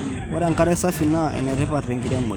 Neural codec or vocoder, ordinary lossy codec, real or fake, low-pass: codec, 44.1 kHz, 7.8 kbps, DAC; none; fake; none